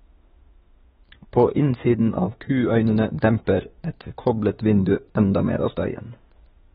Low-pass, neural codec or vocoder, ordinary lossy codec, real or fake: 19.8 kHz; autoencoder, 48 kHz, 32 numbers a frame, DAC-VAE, trained on Japanese speech; AAC, 16 kbps; fake